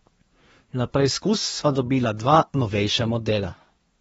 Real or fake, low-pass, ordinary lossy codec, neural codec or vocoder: fake; 10.8 kHz; AAC, 24 kbps; codec, 24 kHz, 1 kbps, SNAC